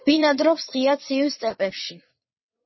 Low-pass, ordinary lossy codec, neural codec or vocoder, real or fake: 7.2 kHz; MP3, 24 kbps; vocoder, 22.05 kHz, 80 mel bands, WaveNeXt; fake